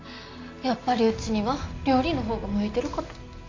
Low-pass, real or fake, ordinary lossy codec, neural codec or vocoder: 7.2 kHz; real; AAC, 32 kbps; none